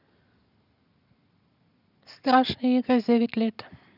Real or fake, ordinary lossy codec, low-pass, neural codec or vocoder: real; none; 5.4 kHz; none